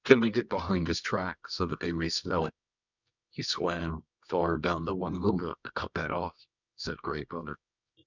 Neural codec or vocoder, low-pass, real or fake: codec, 24 kHz, 0.9 kbps, WavTokenizer, medium music audio release; 7.2 kHz; fake